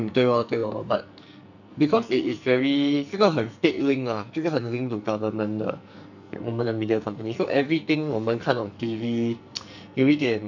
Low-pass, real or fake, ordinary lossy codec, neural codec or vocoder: 7.2 kHz; fake; none; codec, 44.1 kHz, 2.6 kbps, SNAC